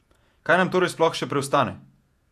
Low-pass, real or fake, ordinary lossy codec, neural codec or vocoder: 14.4 kHz; fake; none; vocoder, 48 kHz, 128 mel bands, Vocos